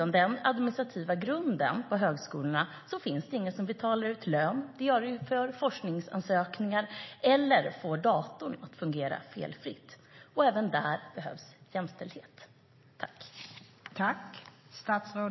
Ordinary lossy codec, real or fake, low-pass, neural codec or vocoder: MP3, 24 kbps; real; 7.2 kHz; none